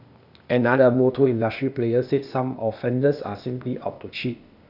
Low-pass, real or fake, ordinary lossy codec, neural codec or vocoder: 5.4 kHz; fake; none; codec, 16 kHz, 0.8 kbps, ZipCodec